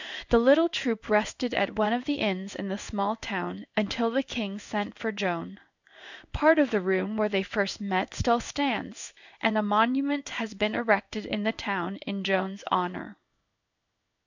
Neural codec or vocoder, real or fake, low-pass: codec, 16 kHz in and 24 kHz out, 1 kbps, XY-Tokenizer; fake; 7.2 kHz